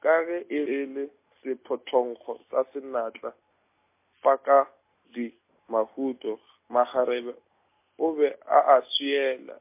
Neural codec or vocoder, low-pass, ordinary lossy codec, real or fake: none; 3.6 kHz; MP3, 24 kbps; real